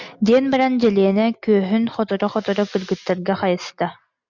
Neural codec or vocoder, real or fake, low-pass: none; real; 7.2 kHz